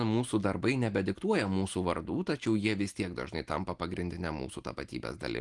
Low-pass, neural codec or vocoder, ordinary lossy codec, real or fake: 10.8 kHz; none; Opus, 24 kbps; real